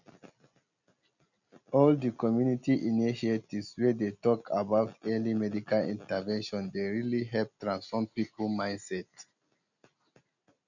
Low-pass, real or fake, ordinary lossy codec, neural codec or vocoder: 7.2 kHz; real; none; none